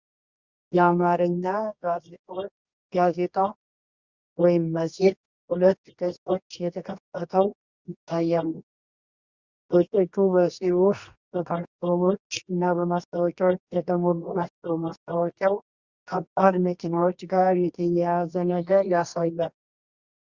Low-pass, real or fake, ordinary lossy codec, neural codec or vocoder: 7.2 kHz; fake; Opus, 64 kbps; codec, 24 kHz, 0.9 kbps, WavTokenizer, medium music audio release